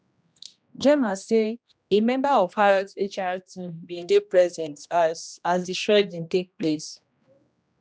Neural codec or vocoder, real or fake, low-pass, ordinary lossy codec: codec, 16 kHz, 1 kbps, X-Codec, HuBERT features, trained on general audio; fake; none; none